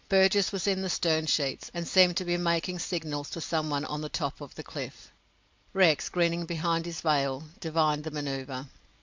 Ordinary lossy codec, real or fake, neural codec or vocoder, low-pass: MP3, 64 kbps; real; none; 7.2 kHz